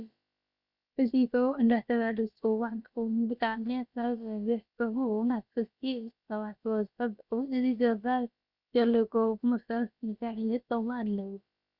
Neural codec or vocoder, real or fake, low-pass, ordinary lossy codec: codec, 16 kHz, about 1 kbps, DyCAST, with the encoder's durations; fake; 5.4 kHz; Opus, 64 kbps